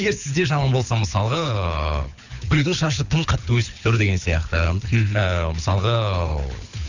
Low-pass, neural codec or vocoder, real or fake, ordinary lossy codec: 7.2 kHz; codec, 24 kHz, 6 kbps, HILCodec; fake; none